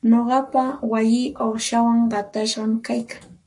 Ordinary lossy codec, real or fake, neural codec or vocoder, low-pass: MP3, 48 kbps; fake; codec, 44.1 kHz, 7.8 kbps, Pupu-Codec; 10.8 kHz